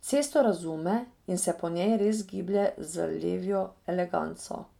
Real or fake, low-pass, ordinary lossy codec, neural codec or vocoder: real; 19.8 kHz; none; none